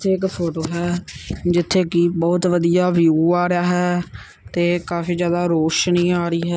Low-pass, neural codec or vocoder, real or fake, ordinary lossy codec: none; none; real; none